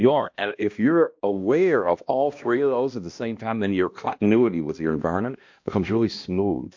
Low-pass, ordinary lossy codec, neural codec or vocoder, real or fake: 7.2 kHz; MP3, 48 kbps; codec, 16 kHz, 1 kbps, X-Codec, HuBERT features, trained on balanced general audio; fake